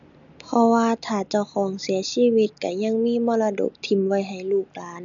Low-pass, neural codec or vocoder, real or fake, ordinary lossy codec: 7.2 kHz; none; real; none